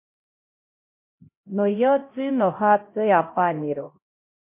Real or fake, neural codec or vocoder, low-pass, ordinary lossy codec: fake; codec, 16 kHz, 0.5 kbps, X-Codec, HuBERT features, trained on LibriSpeech; 3.6 kHz; MP3, 24 kbps